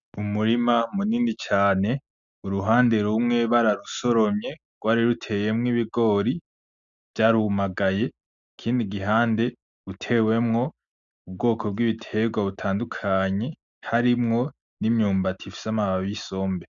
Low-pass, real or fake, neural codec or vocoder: 7.2 kHz; real; none